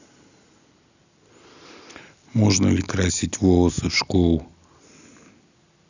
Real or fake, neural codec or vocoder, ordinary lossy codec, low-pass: real; none; none; 7.2 kHz